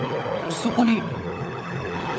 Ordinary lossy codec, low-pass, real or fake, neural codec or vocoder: none; none; fake; codec, 16 kHz, 16 kbps, FunCodec, trained on LibriTTS, 50 frames a second